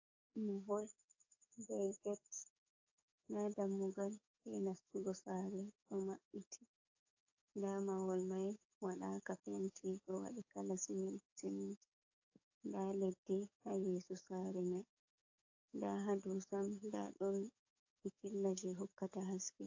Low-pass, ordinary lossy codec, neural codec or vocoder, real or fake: 7.2 kHz; AAC, 48 kbps; codec, 44.1 kHz, 7.8 kbps, DAC; fake